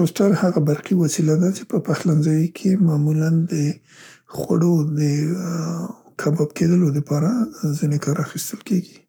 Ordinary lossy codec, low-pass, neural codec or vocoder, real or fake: none; none; codec, 44.1 kHz, 7.8 kbps, Pupu-Codec; fake